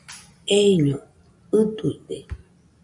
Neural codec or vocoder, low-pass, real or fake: none; 10.8 kHz; real